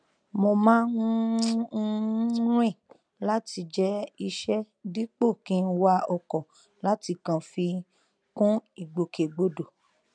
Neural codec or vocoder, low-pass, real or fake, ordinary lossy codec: none; 9.9 kHz; real; none